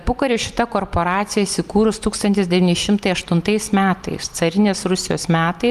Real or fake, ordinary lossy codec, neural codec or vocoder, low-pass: fake; Opus, 32 kbps; vocoder, 44.1 kHz, 128 mel bands every 256 samples, BigVGAN v2; 14.4 kHz